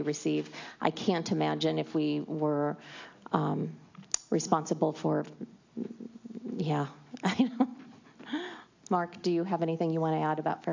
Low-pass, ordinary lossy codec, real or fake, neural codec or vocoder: 7.2 kHz; AAC, 48 kbps; real; none